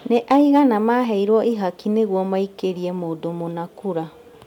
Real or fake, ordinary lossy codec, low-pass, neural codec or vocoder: real; MP3, 96 kbps; 19.8 kHz; none